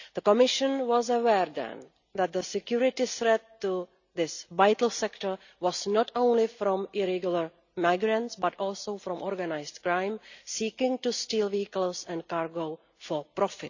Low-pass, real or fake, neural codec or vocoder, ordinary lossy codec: 7.2 kHz; real; none; none